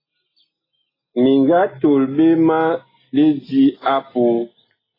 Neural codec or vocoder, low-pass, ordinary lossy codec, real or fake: none; 5.4 kHz; AAC, 24 kbps; real